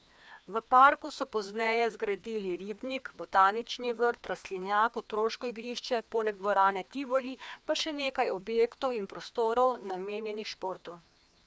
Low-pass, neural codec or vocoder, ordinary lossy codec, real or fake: none; codec, 16 kHz, 2 kbps, FreqCodec, larger model; none; fake